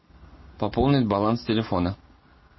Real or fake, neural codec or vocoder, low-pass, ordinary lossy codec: real; none; 7.2 kHz; MP3, 24 kbps